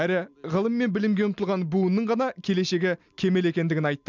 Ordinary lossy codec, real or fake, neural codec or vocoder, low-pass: none; real; none; 7.2 kHz